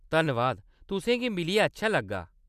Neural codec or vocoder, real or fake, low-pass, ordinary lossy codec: none; real; 14.4 kHz; none